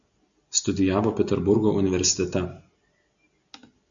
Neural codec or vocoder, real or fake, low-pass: none; real; 7.2 kHz